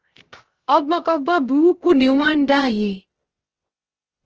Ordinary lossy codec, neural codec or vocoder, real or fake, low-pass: Opus, 16 kbps; codec, 16 kHz, 0.3 kbps, FocalCodec; fake; 7.2 kHz